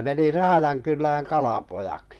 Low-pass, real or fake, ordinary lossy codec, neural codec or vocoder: 19.8 kHz; fake; Opus, 32 kbps; vocoder, 44.1 kHz, 128 mel bands, Pupu-Vocoder